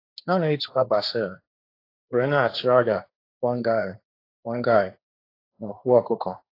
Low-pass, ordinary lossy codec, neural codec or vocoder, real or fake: 5.4 kHz; AAC, 32 kbps; codec, 16 kHz, 1.1 kbps, Voila-Tokenizer; fake